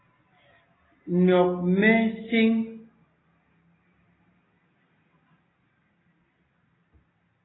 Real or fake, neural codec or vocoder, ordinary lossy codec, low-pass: real; none; AAC, 16 kbps; 7.2 kHz